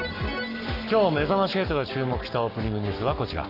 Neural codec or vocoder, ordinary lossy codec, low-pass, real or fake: codec, 44.1 kHz, 7.8 kbps, Pupu-Codec; none; 5.4 kHz; fake